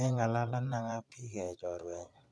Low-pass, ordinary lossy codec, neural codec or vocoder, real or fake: none; none; vocoder, 22.05 kHz, 80 mel bands, Vocos; fake